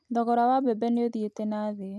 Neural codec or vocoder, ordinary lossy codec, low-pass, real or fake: none; none; none; real